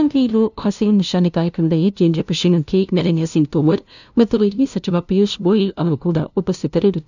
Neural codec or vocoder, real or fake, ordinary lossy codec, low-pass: codec, 16 kHz, 0.5 kbps, FunCodec, trained on LibriTTS, 25 frames a second; fake; none; 7.2 kHz